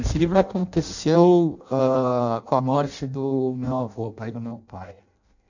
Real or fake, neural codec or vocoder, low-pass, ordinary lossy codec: fake; codec, 16 kHz in and 24 kHz out, 0.6 kbps, FireRedTTS-2 codec; 7.2 kHz; none